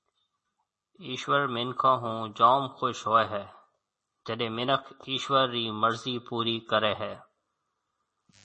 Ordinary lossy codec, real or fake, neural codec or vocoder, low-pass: MP3, 32 kbps; real; none; 10.8 kHz